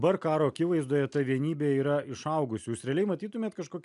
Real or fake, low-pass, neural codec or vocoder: real; 10.8 kHz; none